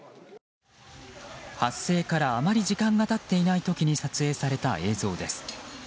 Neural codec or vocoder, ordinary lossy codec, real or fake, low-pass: none; none; real; none